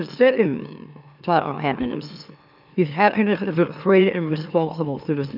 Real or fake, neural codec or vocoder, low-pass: fake; autoencoder, 44.1 kHz, a latent of 192 numbers a frame, MeloTTS; 5.4 kHz